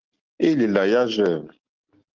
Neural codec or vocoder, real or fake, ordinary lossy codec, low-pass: none; real; Opus, 16 kbps; 7.2 kHz